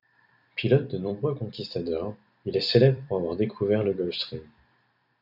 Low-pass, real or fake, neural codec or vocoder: 5.4 kHz; fake; vocoder, 44.1 kHz, 128 mel bands every 256 samples, BigVGAN v2